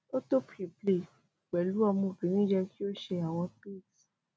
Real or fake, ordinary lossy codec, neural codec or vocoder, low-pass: real; none; none; none